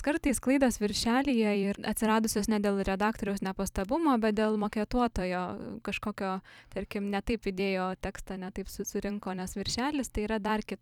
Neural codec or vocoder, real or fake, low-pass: vocoder, 44.1 kHz, 128 mel bands every 256 samples, BigVGAN v2; fake; 19.8 kHz